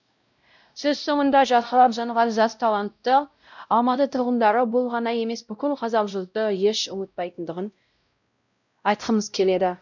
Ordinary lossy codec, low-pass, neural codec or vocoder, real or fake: none; 7.2 kHz; codec, 16 kHz, 0.5 kbps, X-Codec, WavLM features, trained on Multilingual LibriSpeech; fake